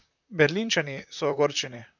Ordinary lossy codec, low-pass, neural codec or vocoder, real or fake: none; 7.2 kHz; vocoder, 44.1 kHz, 128 mel bands, Pupu-Vocoder; fake